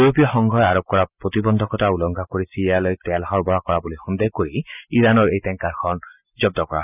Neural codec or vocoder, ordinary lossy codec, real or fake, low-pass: none; none; real; 3.6 kHz